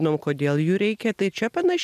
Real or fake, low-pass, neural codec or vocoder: real; 14.4 kHz; none